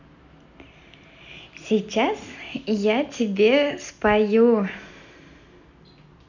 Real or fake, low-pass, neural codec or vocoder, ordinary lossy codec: real; 7.2 kHz; none; none